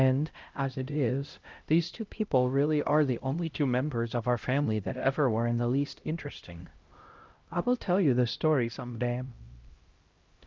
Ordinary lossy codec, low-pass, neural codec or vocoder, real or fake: Opus, 24 kbps; 7.2 kHz; codec, 16 kHz, 0.5 kbps, X-Codec, HuBERT features, trained on LibriSpeech; fake